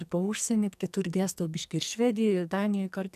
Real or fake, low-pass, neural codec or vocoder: fake; 14.4 kHz; codec, 44.1 kHz, 2.6 kbps, SNAC